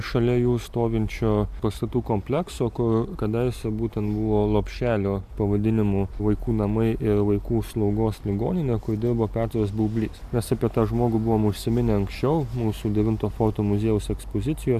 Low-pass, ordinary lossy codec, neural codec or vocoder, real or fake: 14.4 kHz; AAC, 96 kbps; none; real